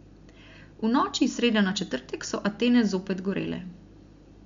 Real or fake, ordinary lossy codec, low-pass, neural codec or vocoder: real; MP3, 64 kbps; 7.2 kHz; none